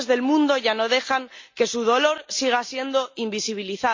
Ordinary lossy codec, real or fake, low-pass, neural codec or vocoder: MP3, 64 kbps; real; 7.2 kHz; none